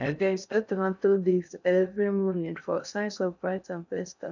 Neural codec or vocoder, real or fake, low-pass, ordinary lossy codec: codec, 16 kHz in and 24 kHz out, 0.6 kbps, FocalCodec, streaming, 2048 codes; fake; 7.2 kHz; none